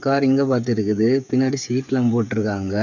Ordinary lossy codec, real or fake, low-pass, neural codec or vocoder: none; fake; 7.2 kHz; codec, 16 kHz, 8 kbps, FreqCodec, smaller model